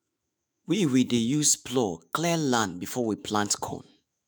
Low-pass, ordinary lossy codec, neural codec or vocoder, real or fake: none; none; autoencoder, 48 kHz, 128 numbers a frame, DAC-VAE, trained on Japanese speech; fake